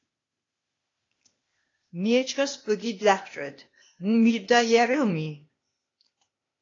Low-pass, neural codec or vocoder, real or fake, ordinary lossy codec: 7.2 kHz; codec, 16 kHz, 0.8 kbps, ZipCodec; fake; AAC, 32 kbps